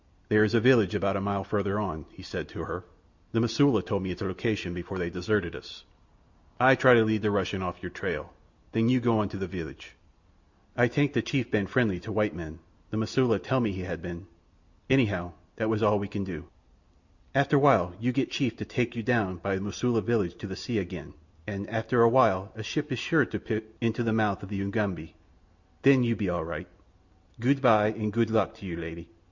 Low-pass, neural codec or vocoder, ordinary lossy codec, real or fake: 7.2 kHz; none; Opus, 64 kbps; real